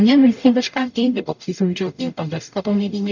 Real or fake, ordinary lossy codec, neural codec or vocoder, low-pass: fake; none; codec, 44.1 kHz, 0.9 kbps, DAC; 7.2 kHz